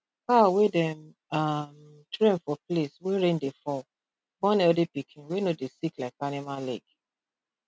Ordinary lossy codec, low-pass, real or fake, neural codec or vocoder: none; none; real; none